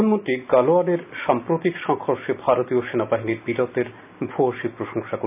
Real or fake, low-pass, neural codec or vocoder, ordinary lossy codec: real; 3.6 kHz; none; none